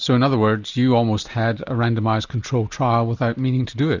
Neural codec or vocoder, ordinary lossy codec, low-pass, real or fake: none; Opus, 64 kbps; 7.2 kHz; real